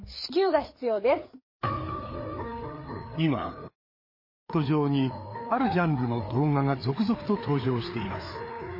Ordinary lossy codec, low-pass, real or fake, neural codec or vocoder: MP3, 24 kbps; 5.4 kHz; fake; codec, 16 kHz, 4 kbps, FreqCodec, larger model